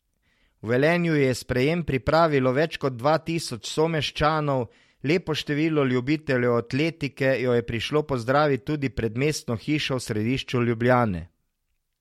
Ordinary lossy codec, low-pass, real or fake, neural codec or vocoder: MP3, 64 kbps; 19.8 kHz; real; none